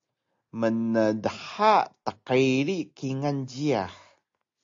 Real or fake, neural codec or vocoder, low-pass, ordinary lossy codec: real; none; 7.2 kHz; AAC, 48 kbps